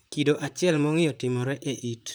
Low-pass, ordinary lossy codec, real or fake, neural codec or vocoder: none; none; fake; vocoder, 44.1 kHz, 128 mel bands, Pupu-Vocoder